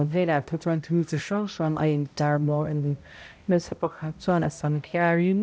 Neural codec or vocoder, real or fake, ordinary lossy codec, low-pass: codec, 16 kHz, 0.5 kbps, X-Codec, HuBERT features, trained on balanced general audio; fake; none; none